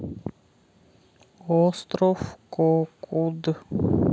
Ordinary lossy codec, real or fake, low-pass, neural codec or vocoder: none; real; none; none